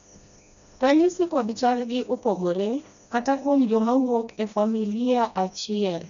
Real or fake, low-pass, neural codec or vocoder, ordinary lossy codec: fake; 7.2 kHz; codec, 16 kHz, 1 kbps, FreqCodec, smaller model; none